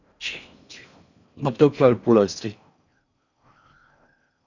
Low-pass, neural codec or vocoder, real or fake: 7.2 kHz; codec, 16 kHz in and 24 kHz out, 0.6 kbps, FocalCodec, streaming, 4096 codes; fake